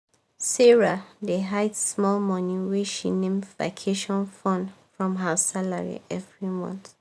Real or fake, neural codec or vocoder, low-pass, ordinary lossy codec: real; none; none; none